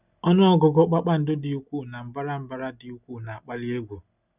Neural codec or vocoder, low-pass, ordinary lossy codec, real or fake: none; 3.6 kHz; none; real